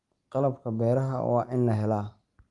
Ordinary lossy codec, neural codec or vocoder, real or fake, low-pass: Opus, 32 kbps; none; real; 10.8 kHz